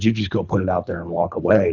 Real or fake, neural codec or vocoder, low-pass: fake; codec, 24 kHz, 3 kbps, HILCodec; 7.2 kHz